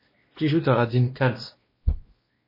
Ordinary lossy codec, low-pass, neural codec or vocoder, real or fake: MP3, 24 kbps; 5.4 kHz; codec, 16 kHz, 1 kbps, X-Codec, WavLM features, trained on Multilingual LibriSpeech; fake